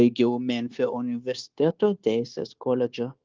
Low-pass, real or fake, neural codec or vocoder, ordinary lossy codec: 7.2 kHz; fake; codec, 16 kHz, 0.9 kbps, LongCat-Audio-Codec; Opus, 32 kbps